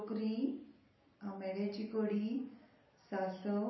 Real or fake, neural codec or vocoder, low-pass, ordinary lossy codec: real; none; 7.2 kHz; MP3, 24 kbps